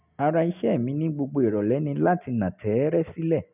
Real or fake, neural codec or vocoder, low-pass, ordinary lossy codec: real; none; 3.6 kHz; none